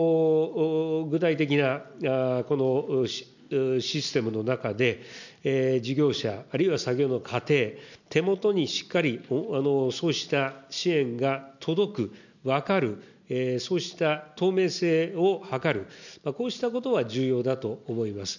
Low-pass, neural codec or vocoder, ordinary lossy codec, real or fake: 7.2 kHz; none; none; real